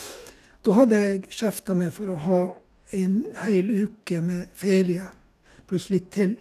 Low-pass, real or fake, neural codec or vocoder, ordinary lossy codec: 14.4 kHz; fake; codec, 44.1 kHz, 2.6 kbps, DAC; none